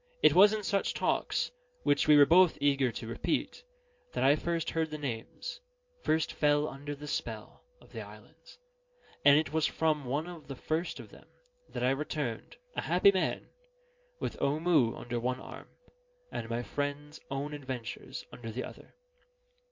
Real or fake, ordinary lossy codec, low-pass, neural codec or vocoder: real; MP3, 48 kbps; 7.2 kHz; none